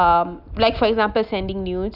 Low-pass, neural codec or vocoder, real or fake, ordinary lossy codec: 5.4 kHz; none; real; none